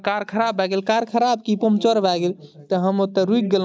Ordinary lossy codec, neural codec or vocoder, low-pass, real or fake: none; none; none; real